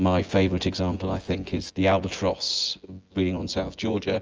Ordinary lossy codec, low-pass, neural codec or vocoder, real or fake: Opus, 24 kbps; 7.2 kHz; vocoder, 24 kHz, 100 mel bands, Vocos; fake